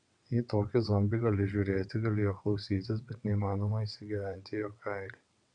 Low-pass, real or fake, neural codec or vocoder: 9.9 kHz; fake; vocoder, 22.05 kHz, 80 mel bands, WaveNeXt